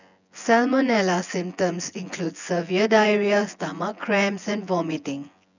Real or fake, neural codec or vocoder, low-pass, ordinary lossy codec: fake; vocoder, 24 kHz, 100 mel bands, Vocos; 7.2 kHz; none